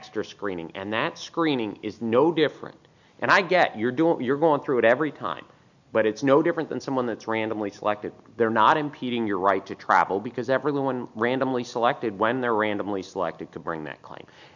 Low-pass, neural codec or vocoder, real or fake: 7.2 kHz; none; real